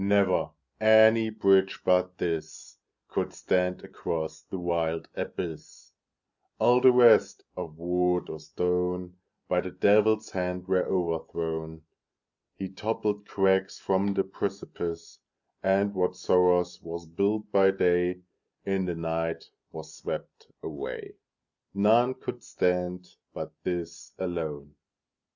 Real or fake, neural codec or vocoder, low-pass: real; none; 7.2 kHz